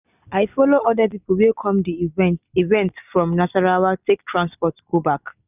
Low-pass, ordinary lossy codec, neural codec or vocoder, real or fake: 3.6 kHz; none; none; real